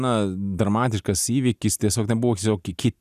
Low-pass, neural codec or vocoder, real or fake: 14.4 kHz; none; real